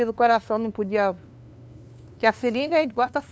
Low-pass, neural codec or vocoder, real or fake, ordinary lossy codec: none; codec, 16 kHz, 2 kbps, FunCodec, trained on LibriTTS, 25 frames a second; fake; none